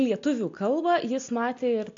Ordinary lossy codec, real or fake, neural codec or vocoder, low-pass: AAC, 48 kbps; real; none; 7.2 kHz